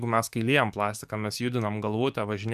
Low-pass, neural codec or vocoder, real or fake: 14.4 kHz; codec, 44.1 kHz, 7.8 kbps, DAC; fake